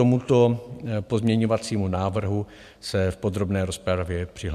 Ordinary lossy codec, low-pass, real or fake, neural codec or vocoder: MP3, 96 kbps; 14.4 kHz; real; none